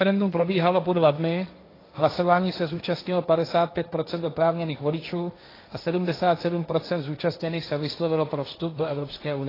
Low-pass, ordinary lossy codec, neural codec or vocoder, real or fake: 5.4 kHz; AAC, 24 kbps; codec, 16 kHz, 1.1 kbps, Voila-Tokenizer; fake